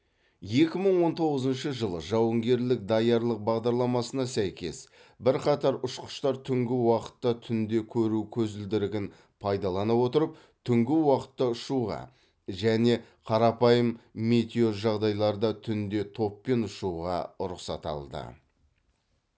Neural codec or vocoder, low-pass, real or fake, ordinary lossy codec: none; none; real; none